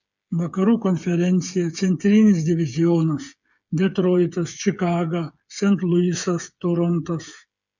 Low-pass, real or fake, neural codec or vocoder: 7.2 kHz; fake; codec, 16 kHz, 8 kbps, FreqCodec, smaller model